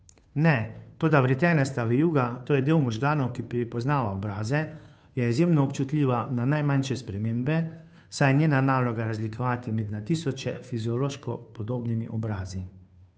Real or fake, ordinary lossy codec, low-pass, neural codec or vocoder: fake; none; none; codec, 16 kHz, 2 kbps, FunCodec, trained on Chinese and English, 25 frames a second